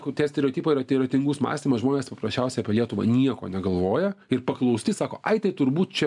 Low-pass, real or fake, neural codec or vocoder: 10.8 kHz; real; none